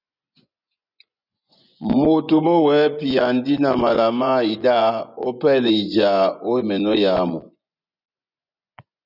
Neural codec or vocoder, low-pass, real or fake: vocoder, 24 kHz, 100 mel bands, Vocos; 5.4 kHz; fake